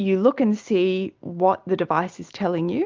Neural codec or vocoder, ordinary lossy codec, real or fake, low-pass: none; Opus, 24 kbps; real; 7.2 kHz